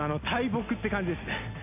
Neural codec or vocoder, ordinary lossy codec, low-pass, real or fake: none; AAC, 32 kbps; 3.6 kHz; real